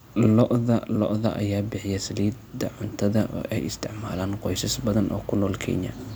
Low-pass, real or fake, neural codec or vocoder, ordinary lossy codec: none; real; none; none